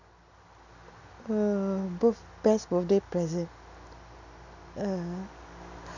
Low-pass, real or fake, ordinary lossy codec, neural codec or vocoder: 7.2 kHz; real; none; none